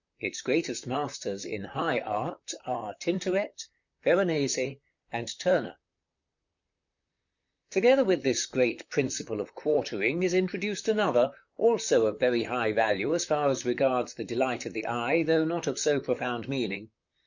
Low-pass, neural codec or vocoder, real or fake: 7.2 kHz; vocoder, 44.1 kHz, 128 mel bands, Pupu-Vocoder; fake